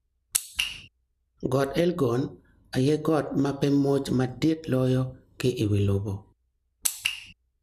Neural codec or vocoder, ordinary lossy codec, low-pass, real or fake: none; none; 14.4 kHz; real